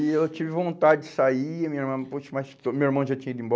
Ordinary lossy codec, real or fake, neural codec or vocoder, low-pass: none; real; none; none